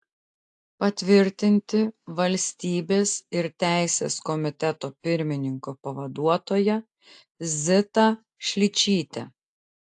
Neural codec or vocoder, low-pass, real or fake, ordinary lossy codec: none; 10.8 kHz; real; AAC, 64 kbps